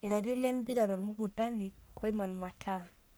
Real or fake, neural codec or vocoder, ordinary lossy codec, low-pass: fake; codec, 44.1 kHz, 1.7 kbps, Pupu-Codec; none; none